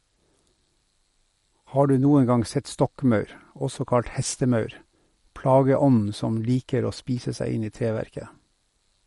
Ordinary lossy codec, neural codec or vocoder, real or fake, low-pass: MP3, 48 kbps; none; real; 19.8 kHz